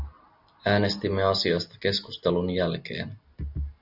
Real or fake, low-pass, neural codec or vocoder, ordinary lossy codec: real; 5.4 kHz; none; Opus, 64 kbps